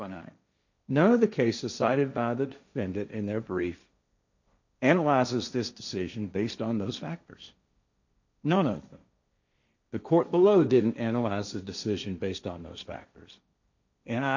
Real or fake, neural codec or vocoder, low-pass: fake; codec, 16 kHz, 1.1 kbps, Voila-Tokenizer; 7.2 kHz